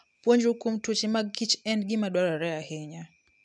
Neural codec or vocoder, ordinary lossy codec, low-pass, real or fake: none; none; 10.8 kHz; real